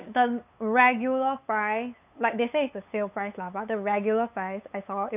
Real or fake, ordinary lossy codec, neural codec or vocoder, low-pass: fake; none; codec, 16 kHz, 8 kbps, FunCodec, trained on LibriTTS, 25 frames a second; 3.6 kHz